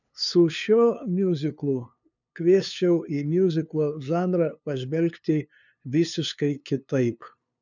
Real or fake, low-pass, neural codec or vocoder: fake; 7.2 kHz; codec, 16 kHz, 2 kbps, FunCodec, trained on LibriTTS, 25 frames a second